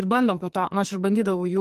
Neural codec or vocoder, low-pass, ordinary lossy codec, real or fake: codec, 44.1 kHz, 2.6 kbps, SNAC; 14.4 kHz; Opus, 24 kbps; fake